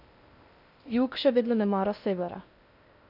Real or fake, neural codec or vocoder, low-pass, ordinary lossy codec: fake; codec, 16 kHz in and 24 kHz out, 0.6 kbps, FocalCodec, streaming, 2048 codes; 5.4 kHz; none